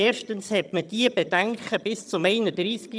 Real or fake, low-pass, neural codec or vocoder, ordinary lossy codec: fake; none; vocoder, 22.05 kHz, 80 mel bands, HiFi-GAN; none